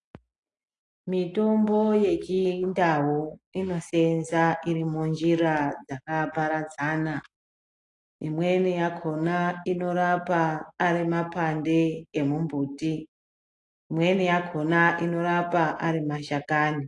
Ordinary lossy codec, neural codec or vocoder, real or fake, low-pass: AAC, 48 kbps; none; real; 10.8 kHz